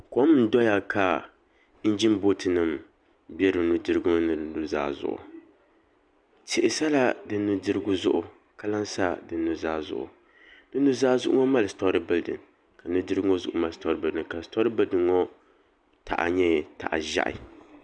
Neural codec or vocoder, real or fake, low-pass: none; real; 9.9 kHz